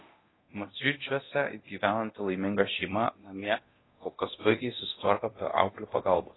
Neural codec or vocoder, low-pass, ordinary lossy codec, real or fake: codec, 24 kHz, 0.9 kbps, DualCodec; 7.2 kHz; AAC, 16 kbps; fake